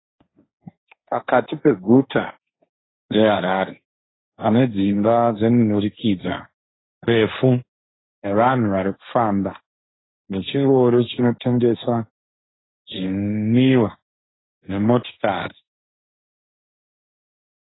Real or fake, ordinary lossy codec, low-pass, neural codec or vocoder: fake; AAC, 16 kbps; 7.2 kHz; codec, 16 kHz, 1.1 kbps, Voila-Tokenizer